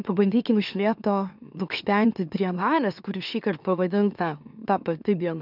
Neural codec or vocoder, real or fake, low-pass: autoencoder, 44.1 kHz, a latent of 192 numbers a frame, MeloTTS; fake; 5.4 kHz